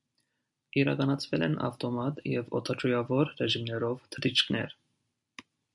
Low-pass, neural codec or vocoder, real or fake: 10.8 kHz; none; real